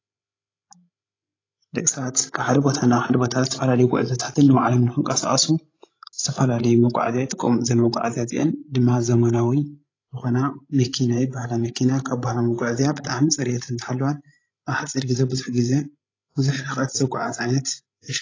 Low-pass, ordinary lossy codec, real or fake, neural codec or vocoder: 7.2 kHz; AAC, 32 kbps; fake; codec, 16 kHz, 16 kbps, FreqCodec, larger model